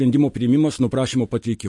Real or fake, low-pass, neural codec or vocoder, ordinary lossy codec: real; 10.8 kHz; none; MP3, 48 kbps